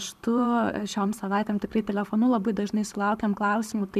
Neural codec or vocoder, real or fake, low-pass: vocoder, 44.1 kHz, 128 mel bands every 512 samples, BigVGAN v2; fake; 14.4 kHz